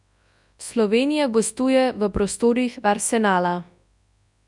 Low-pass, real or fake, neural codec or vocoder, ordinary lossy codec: 10.8 kHz; fake; codec, 24 kHz, 0.9 kbps, WavTokenizer, large speech release; none